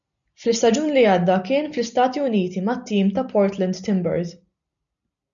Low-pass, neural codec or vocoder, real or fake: 7.2 kHz; none; real